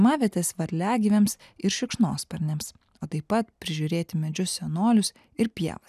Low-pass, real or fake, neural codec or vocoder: 14.4 kHz; real; none